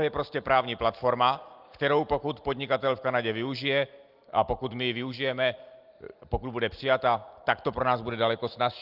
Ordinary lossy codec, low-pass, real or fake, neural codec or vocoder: Opus, 32 kbps; 5.4 kHz; real; none